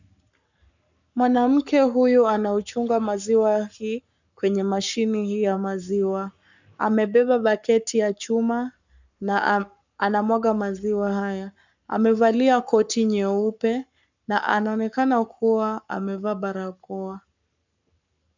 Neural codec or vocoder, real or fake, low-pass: codec, 44.1 kHz, 7.8 kbps, Pupu-Codec; fake; 7.2 kHz